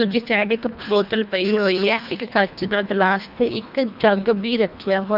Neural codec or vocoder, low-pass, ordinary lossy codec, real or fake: codec, 24 kHz, 1.5 kbps, HILCodec; 5.4 kHz; none; fake